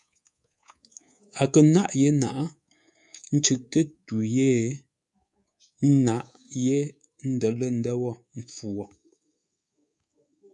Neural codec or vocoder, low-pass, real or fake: codec, 24 kHz, 3.1 kbps, DualCodec; 10.8 kHz; fake